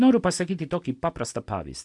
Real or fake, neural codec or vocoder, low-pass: real; none; 10.8 kHz